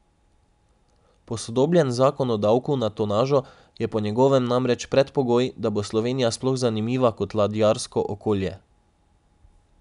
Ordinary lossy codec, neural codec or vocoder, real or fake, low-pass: none; none; real; 10.8 kHz